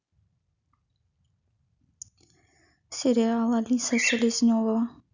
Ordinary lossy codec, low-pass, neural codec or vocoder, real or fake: none; 7.2 kHz; none; real